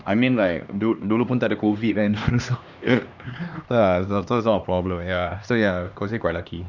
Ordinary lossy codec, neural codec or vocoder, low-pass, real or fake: none; codec, 16 kHz, 2 kbps, X-Codec, HuBERT features, trained on LibriSpeech; 7.2 kHz; fake